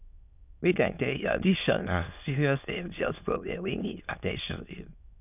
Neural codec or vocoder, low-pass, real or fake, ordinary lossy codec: autoencoder, 22.05 kHz, a latent of 192 numbers a frame, VITS, trained on many speakers; 3.6 kHz; fake; none